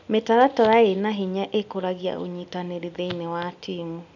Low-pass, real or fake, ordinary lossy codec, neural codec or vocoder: 7.2 kHz; real; none; none